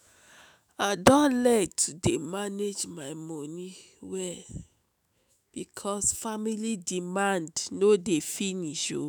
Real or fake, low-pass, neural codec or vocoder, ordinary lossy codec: fake; none; autoencoder, 48 kHz, 128 numbers a frame, DAC-VAE, trained on Japanese speech; none